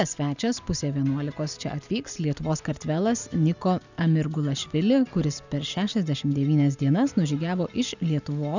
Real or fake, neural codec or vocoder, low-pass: real; none; 7.2 kHz